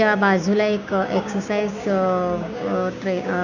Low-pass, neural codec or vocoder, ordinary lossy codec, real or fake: 7.2 kHz; codec, 16 kHz, 6 kbps, DAC; none; fake